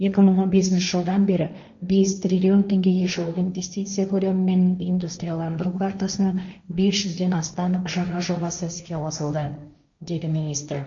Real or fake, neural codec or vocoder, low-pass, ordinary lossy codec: fake; codec, 16 kHz, 1.1 kbps, Voila-Tokenizer; 7.2 kHz; MP3, 64 kbps